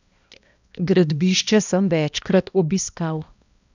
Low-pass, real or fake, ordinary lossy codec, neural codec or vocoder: 7.2 kHz; fake; none; codec, 16 kHz, 1 kbps, X-Codec, HuBERT features, trained on balanced general audio